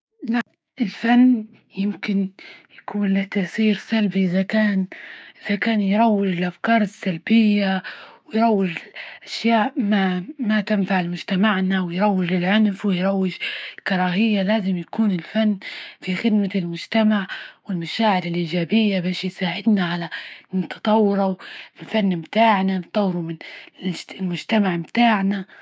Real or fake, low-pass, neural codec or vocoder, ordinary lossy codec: fake; none; codec, 16 kHz, 6 kbps, DAC; none